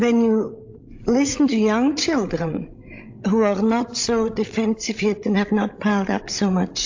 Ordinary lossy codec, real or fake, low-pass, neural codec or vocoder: AAC, 48 kbps; fake; 7.2 kHz; codec, 16 kHz, 16 kbps, FreqCodec, larger model